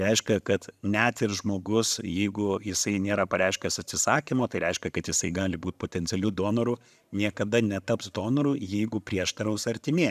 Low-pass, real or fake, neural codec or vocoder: 14.4 kHz; fake; vocoder, 44.1 kHz, 128 mel bands every 512 samples, BigVGAN v2